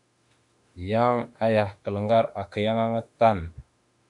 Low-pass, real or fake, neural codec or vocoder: 10.8 kHz; fake; autoencoder, 48 kHz, 32 numbers a frame, DAC-VAE, trained on Japanese speech